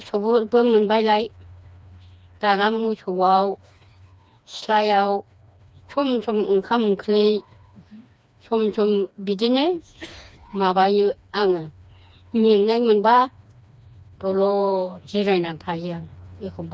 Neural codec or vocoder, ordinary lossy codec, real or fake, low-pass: codec, 16 kHz, 2 kbps, FreqCodec, smaller model; none; fake; none